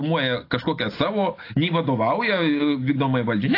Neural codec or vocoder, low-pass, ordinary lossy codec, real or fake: none; 5.4 kHz; AAC, 32 kbps; real